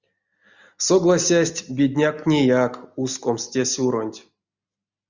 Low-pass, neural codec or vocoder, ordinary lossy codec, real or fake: 7.2 kHz; none; Opus, 64 kbps; real